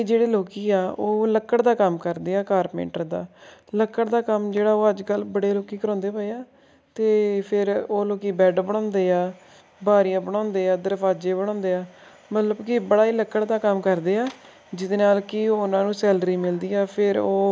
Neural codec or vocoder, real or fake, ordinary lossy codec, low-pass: none; real; none; none